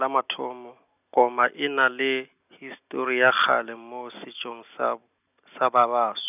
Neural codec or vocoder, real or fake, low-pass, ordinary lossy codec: none; real; 3.6 kHz; none